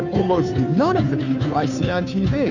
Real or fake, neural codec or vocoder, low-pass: fake; codec, 16 kHz, 2 kbps, FunCodec, trained on Chinese and English, 25 frames a second; 7.2 kHz